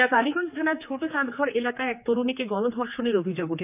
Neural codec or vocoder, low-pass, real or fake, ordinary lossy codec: codec, 16 kHz, 4 kbps, X-Codec, HuBERT features, trained on general audio; 3.6 kHz; fake; none